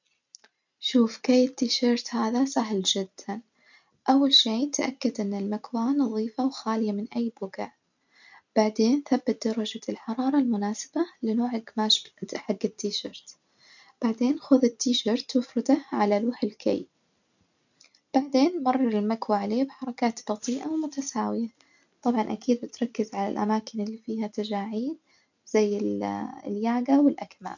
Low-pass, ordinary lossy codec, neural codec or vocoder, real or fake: 7.2 kHz; none; none; real